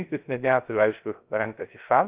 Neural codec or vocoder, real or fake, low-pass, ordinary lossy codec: codec, 16 kHz, 0.3 kbps, FocalCodec; fake; 3.6 kHz; Opus, 24 kbps